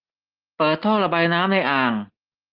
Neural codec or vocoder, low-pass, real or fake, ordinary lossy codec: none; 5.4 kHz; real; Opus, 24 kbps